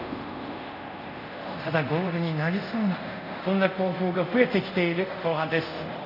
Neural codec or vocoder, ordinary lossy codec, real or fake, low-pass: codec, 24 kHz, 0.5 kbps, DualCodec; none; fake; 5.4 kHz